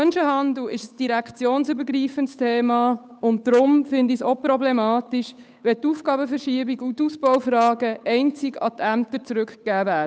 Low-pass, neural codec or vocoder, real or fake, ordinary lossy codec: none; codec, 16 kHz, 8 kbps, FunCodec, trained on Chinese and English, 25 frames a second; fake; none